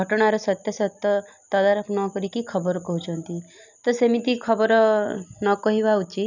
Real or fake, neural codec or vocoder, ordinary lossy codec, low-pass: real; none; none; 7.2 kHz